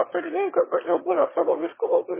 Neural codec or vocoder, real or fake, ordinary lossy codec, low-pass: autoencoder, 22.05 kHz, a latent of 192 numbers a frame, VITS, trained on one speaker; fake; MP3, 16 kbps; 3.6 kHz